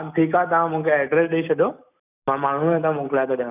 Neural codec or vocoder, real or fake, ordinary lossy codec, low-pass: none; real; none; 3.6 kHz